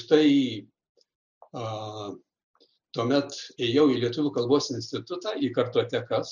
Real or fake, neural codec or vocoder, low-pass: real; none; 7.2 kHz